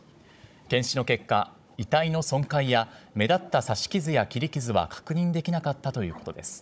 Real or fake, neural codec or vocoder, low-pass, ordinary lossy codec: fake; codec, 16 kHz, 16 kbps, FunCodec, trained on Chinese and English, 50 frames a second; none; none